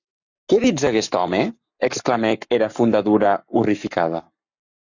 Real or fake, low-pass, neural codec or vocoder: fake; 7.2 kHz; codec, 44.1 kHz, 7.8 kbps, Pupu-Codec